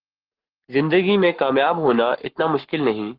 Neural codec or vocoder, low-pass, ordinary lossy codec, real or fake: codec, 44.1 kHz, 7.8 kbps, Pupu-Codec; 5.4 kHz; Opus, 16 kbps; fake